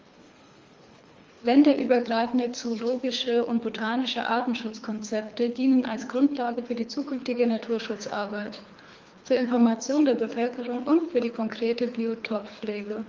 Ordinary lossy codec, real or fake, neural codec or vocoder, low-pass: Opus, 32 kbps; fake; codec, 24 kHz, 3 kbps, HILCodec; 7.2 kHz